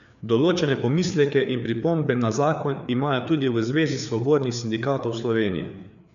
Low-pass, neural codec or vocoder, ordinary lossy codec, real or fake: 7.2 kHz; codec, 16 kHz, 4 kbps, FreqCodec, larger model; none; fake